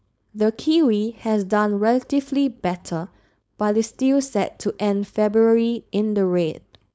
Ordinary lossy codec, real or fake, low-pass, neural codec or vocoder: none; fake; none; codec, 16 kHz, 4.8 kbps, FACodec